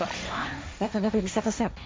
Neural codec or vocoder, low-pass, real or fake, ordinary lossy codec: codec, 16 kHz, 1.1 kbps, Voila-Tokenizer; none; fake; none